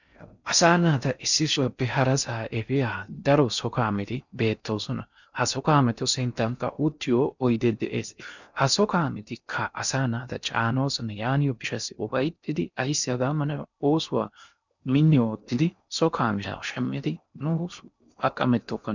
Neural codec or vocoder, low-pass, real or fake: codec, 16 kHz in and 24 kHz out, 0.6 kbps, FocalCodec, streaming, 4096 codes; 7.2 kHz; fake